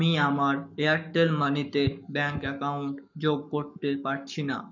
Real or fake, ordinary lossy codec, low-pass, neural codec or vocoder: fake; none; 7.2 kHz; codec, 44.1 kHz, 7.8 kbps, Pupu-Codec